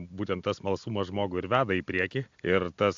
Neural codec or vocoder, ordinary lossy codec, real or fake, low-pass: none; AAC, 64 kbps; real; 7.2 kHz